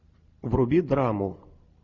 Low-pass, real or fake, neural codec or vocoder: 7.2 kHz; real; none